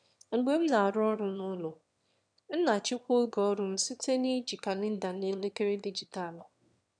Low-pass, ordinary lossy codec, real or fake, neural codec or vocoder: 9.9 kHz; none; fake; autoencoder, 22.05 kHz, a latent of 192 numbers a frame, VITS, trained on one speaker